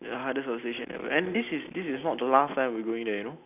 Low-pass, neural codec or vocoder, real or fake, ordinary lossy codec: 3.6 kHz; none; real; none